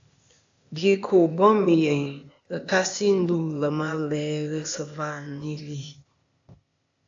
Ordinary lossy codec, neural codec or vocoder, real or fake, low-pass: AAC, 64 kbps; codec, 16 kHz, 0.8 kbps, ZipCodec; fake; 7.2 kHz